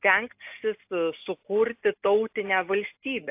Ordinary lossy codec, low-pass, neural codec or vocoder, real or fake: AAC, 32 kbps; 3.6 kHz; none; real